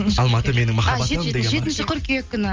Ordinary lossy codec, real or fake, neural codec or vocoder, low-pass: Opus, 32 kbps; real; none; 7.2 kHz